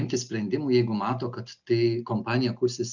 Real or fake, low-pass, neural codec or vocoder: real; 7.2 kHz; none